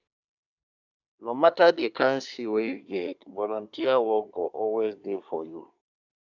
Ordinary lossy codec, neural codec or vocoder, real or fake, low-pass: none; codec, 24 kHz, 1 kbps, SNAC; fake; 7.2 kHz